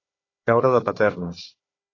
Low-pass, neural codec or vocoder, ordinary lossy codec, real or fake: 7.2 kHz; codec, 16 kHz, 4 kbps, FunCodec, trained on Chinese and English, 50 frames a second; AAC, 32 kbps; fake